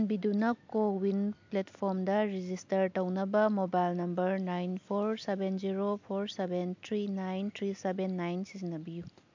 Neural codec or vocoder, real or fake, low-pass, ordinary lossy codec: none; real; 7.2 kHz; MP3, 64 kbps